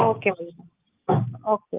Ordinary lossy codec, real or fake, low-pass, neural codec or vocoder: Opus, 32 kbps; real; 3.6 kHz; none